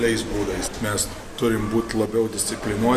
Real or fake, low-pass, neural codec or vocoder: real; 14.4 kHz; none